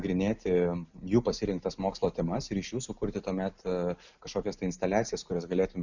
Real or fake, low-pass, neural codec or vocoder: real; 7.2 kHz; none